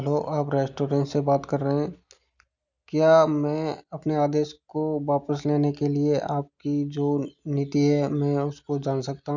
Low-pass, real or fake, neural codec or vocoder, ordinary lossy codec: 7.2 kHz; real; none; none